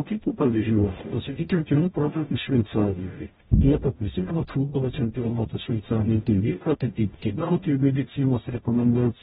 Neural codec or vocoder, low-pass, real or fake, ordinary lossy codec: codec, 44.1 kHz, 0.9 kbps, DAC; 19.8 kHz; fake; AAC, 16 kbps